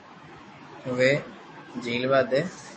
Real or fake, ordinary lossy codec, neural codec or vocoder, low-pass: fake; MP3, 32 kbps; autoencoder, 48 kHz, 128 numbers a frame, DAC-VAE, trained on Japanese speech; 10.8 kHz